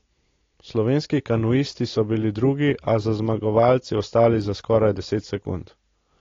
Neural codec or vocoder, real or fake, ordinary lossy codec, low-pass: none; real; AAC, 32 kbps; 7.2 kHz